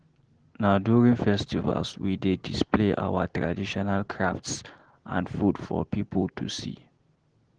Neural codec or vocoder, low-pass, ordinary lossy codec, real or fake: none; 9.9 kHz; Opus, 16 kbps; real